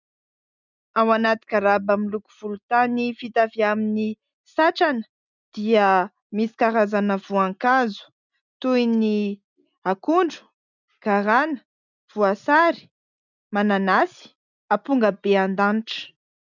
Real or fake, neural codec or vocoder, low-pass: real; none; 7.2 kHz